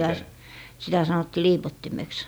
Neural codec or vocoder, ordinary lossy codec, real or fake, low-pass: none; none; real; none